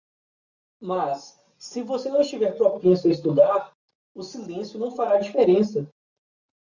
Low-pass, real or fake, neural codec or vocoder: 7.2 kHz; real; none